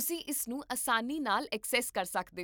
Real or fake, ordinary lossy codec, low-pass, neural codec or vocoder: real; none; none; none